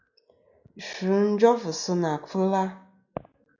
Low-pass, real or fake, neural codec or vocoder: 7.2 kHz; real; none